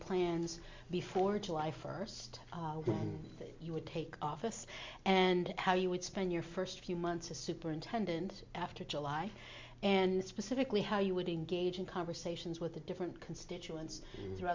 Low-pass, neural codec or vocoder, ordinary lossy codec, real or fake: 7.2 kHz; none; MP3, 64 kbps; real